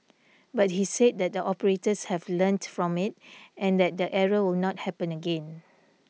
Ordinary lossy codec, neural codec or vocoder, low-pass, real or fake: none; none; none; real